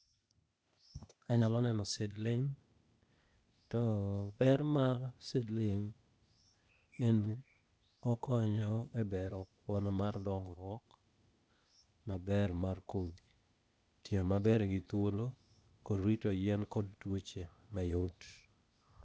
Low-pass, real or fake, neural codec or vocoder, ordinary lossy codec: none; fake; codec, 16 kHz, 0.8 kbps, ZipCodec; none